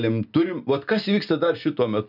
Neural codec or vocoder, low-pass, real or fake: none; 5.4 kHz; real